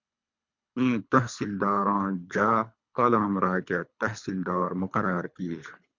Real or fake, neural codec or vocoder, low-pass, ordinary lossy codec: fake; codec, 24 kHz, 3 kbps, HILCodec; 7.2 kHz; MP3, 64 kbps